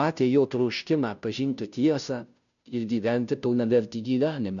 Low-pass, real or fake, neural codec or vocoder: 7.2 kHz; fake; codec, 16 kHz, 0.5 kbps, FunCodec, trained on Chinese and English, 25 frames a second